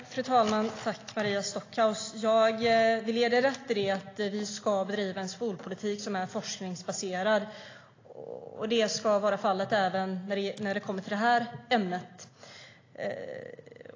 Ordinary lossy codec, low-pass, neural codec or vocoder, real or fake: AAC, 32 kbps; 7.2 kHz; none; real